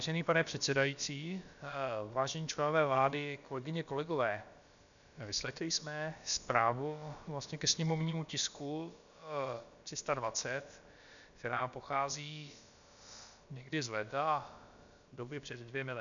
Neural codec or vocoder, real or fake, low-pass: codec, 16 kHz, about 1 kbps, DyCAST, with the encoder's durations; fake; 7.2 kHz